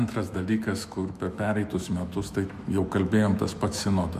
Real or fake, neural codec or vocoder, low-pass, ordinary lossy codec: fake; autoencoder, 48 kHz, 128 numbers a frame, DAC-VAE, trained on Japanese speech; 14.4 kHz; MP3, 96 kbps